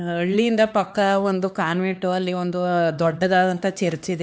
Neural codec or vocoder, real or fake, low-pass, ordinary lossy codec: codec, 16 kHz, 2 kbps, X-Codec, HuBERT features, trained on LibriSpeech; fake; none; none